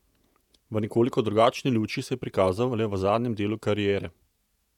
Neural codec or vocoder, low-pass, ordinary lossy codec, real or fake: vocoder, 48 kHz, 128 mel bands, Vocos; 19.8 kHz; none; fake